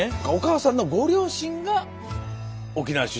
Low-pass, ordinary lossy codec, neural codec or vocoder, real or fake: none; none; none; real